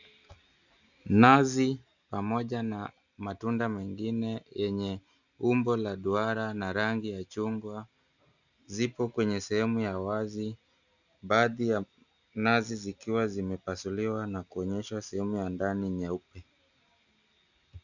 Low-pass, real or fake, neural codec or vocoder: 7.2 kHz; real; none